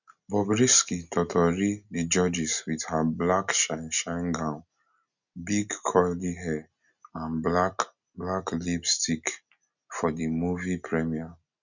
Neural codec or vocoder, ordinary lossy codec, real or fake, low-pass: none; none; real; 7.2 kHz